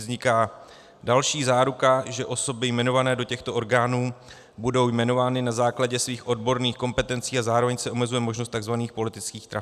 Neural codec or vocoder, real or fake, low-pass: none; real; 14.4 kHz